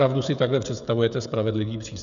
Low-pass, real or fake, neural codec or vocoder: 7.2 kHz; fake; codec, 16 kHz, 16 kbps, FreqCodec, smaller model